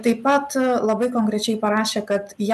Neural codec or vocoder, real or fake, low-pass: none; real; 14.4 kHz